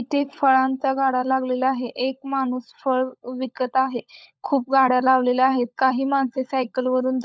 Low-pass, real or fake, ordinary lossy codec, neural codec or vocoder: none; fake; none; codec, 16 kHz, 16 kbps, FunCodec, trained on LibriTTS, 50 frames a second